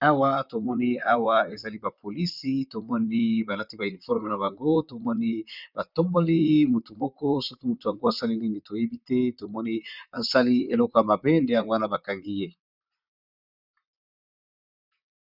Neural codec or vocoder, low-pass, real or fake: vocoder, 44.1 kHz, 128 mel bands, Pupu-Vocoder; 5.4 kHz; fake